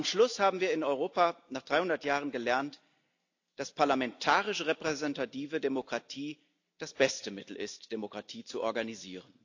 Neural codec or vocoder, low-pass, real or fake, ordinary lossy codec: none; 7.2 kHz; real; AAC, 48 kbps